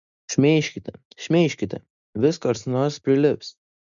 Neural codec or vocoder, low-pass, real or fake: none; 7.2 kHz; real